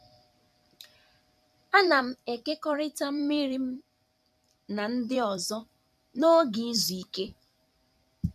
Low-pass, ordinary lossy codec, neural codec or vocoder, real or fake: 14.4 kHz; none; vocoder, 44.1 kHz, 128 mel bands every 256 samples, BigVGAN v2; fake